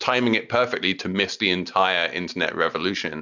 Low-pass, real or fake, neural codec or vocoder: 7.2 kHz; real; none